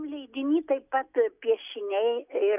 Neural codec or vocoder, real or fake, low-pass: none; real; 3.6 kHz